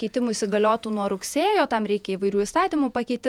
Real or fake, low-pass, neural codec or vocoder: fake; 19.8 kHz; vocoder, 48 kHz, 128 mel bands, Vocos